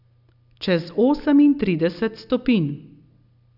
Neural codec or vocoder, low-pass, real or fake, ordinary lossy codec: none; 5.4 kHz; real; none